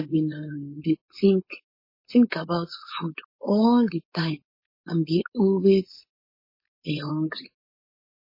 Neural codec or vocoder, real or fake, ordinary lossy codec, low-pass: codec, 16 kHz, 4.8 kbps, FACodec; fake; MP3, 24 kbps; 5.4 kHz